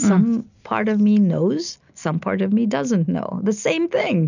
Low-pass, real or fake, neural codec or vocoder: 7.2 kHz; real; none